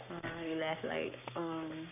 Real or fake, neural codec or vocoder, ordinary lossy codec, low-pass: fake; codec, 44.1 kHz, 7.8 kbps, Pupu-Codec; none; 3.6 kHz